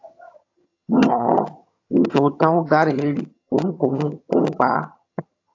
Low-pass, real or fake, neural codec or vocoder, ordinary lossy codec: 7.2 kHz; fake; vocoder, 22.05 kHz, 80 mel bands, HiFi-GAN; AAC, 48 kbps